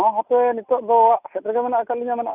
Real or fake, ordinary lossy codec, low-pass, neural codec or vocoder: real; none; 3.6 kHz; none